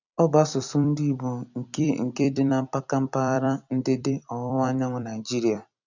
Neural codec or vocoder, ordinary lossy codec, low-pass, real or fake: vocoder, 44.1 kHz, 128 mel bands every 256 samples, BigVGAN v2; none; 7.2 kHz; fake